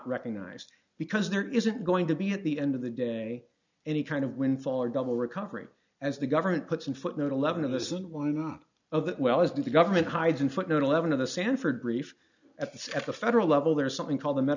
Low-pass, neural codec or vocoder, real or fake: 7.2 kHz; none; real